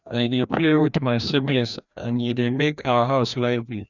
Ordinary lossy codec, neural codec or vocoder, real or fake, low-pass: none; codec, 16 kHz, 1 kbps, FreqCodec, larger model; fake; 7.2 kHz